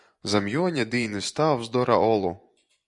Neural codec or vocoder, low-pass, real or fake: vocoder, 24 kHz, 100 mel bands, Vocos; 10.8 kHz; fake